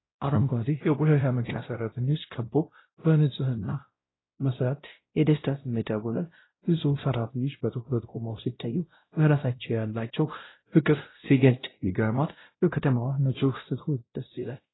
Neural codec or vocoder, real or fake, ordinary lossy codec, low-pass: codec, 16 kHz, 0.5 kbps, X-Codec, WavLM features, trained on Multilingual LibriSpeech; fake; AAC, 16 kbps; 7.2 kHz